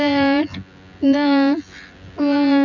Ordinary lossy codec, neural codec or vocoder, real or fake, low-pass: none; vocoder, 24 kHz, 100 mel bands, Vocos; fake; 7.2 kHz